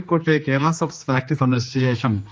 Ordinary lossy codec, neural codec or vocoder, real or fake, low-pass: none; codec, 16 kHz, 2 kbps, X-Codec, HuBERT features, trained on general audio; fake; none